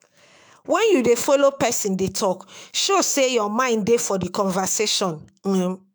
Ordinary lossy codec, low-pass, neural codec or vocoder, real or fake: none; none; autoencoder, 48 kHz, 128 numbers a frame, DAC-VAE, trained on Japanese speech; fake